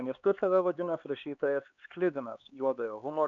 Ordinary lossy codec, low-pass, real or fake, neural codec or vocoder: MP3, 96 kbps; 7.2 kHz; fake; codec, 16 kHz, 4 kbps, X-Codec, HuBERT features, trained on LibriSpeech